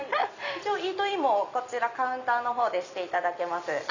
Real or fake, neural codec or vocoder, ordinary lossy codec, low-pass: real; none; none; 7.2 kHz